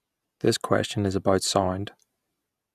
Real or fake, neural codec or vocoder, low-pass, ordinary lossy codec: real; none; 14.4 kHz; none